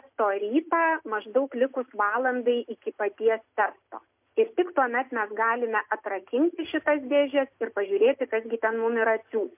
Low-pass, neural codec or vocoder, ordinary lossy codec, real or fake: 3.6 kHz; none; MP3, 32 kbps; real